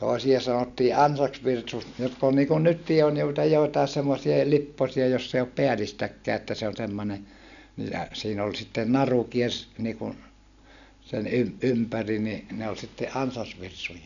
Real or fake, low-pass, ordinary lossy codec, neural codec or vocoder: real; 7.2 kHz; none; none